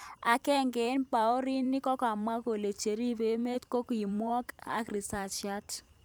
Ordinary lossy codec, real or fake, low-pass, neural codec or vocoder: none; fake; none; vocoder, 44.1 kHz, 128 mel bands every 512 samples, BigVGAN v2